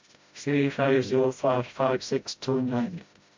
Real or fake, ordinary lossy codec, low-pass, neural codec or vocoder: fake; MP3, 48 kbps; 7.2 kHz; codec, 16 kHz, 0.5 kbps, FreqCodec, smaller model